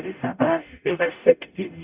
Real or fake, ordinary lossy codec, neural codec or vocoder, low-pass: fake; Opus, 64 kbps; codec, 44.1 kHz, 0.9 kbps, DAC; 3.6 kHz